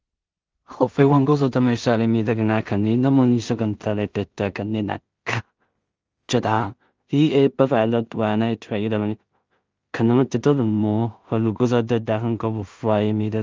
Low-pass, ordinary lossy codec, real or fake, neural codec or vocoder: 7.2 kHz; Opus, 24 kbps; fake; codec, 16 kHz in and 24 kHz out, 0.4 kbps, LongCat-Audio-Codec, two codebook decoder